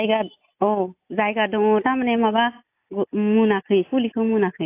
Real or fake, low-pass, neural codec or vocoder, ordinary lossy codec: real; 3.6 kHz; none; AAC, 24 kbps